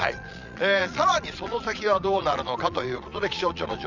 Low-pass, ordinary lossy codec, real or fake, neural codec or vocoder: 7.2 kHz; none; fake; vocoder, 22.05 kHz, 80 mel bands, Vocos